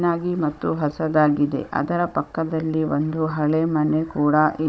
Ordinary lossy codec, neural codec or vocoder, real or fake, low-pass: none; codec, 16 kHz, 16 kbps, FunCodec, trained on Chinese and English, 50 frames a second; fake; none